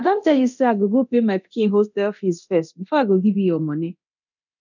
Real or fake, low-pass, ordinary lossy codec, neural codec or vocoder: fake; 7.2 kHz; none; codec, 24 kHz, 0.9 kbps, DualCodec